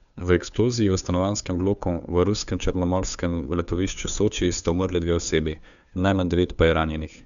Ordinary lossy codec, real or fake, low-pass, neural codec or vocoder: none; fake; 7.2 kHz; codec, 16 kHz, 2 kbps, FunCodec, trained on Chinese and English, 25 frames a second